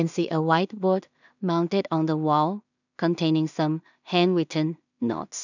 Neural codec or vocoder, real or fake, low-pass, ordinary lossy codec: codec, 16 kHz in and 24 kHz out, 0.4 kbps, LongCat-Audio-Codec, two codebook decoder; fake; 7.2 kHz; none